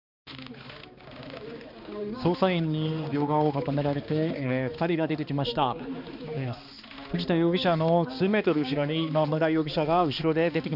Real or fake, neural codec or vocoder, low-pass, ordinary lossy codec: fake; codec, 16 kHz, 2 kbps, X-Codec, HuBERT features, trained on balanced general audio; 5.4 kHz; none